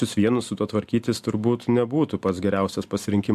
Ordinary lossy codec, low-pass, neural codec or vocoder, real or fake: AAC, 96 kbps; 14.4 kHz; none; real